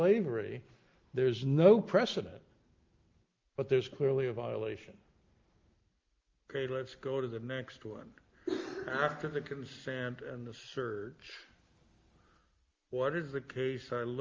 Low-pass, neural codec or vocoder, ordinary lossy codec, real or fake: 7.2 kHz; none; Opus, 32 kbps; real